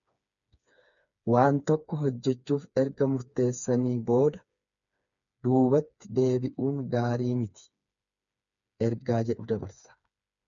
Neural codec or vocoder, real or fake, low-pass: codec, 16 kHz, 4 kbps, FreqCodec, smaller model; fake; 7.2 kHz